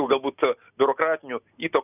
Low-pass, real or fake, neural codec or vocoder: 3.6 kHz; real; none